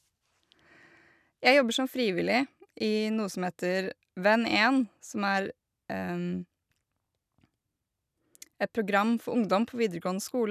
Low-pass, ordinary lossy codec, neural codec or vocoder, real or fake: 14.4 kHz; none; none; real